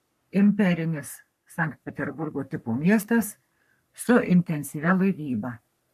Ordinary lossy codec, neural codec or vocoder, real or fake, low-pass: MP3, 96 kbps; codec, 44.1 kHz, 3.4 kbps, Pupu-Codec; fake; 14.4 kHz